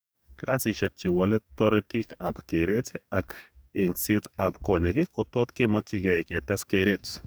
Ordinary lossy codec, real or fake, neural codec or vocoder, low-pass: none; fake; codec, 44.1 kHz, 2.6 kbps, DAC; none